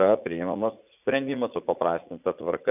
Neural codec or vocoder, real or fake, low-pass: vocoder, 44.1 kHz, 80 mel bands, Vocos; fake; 3.6 kHz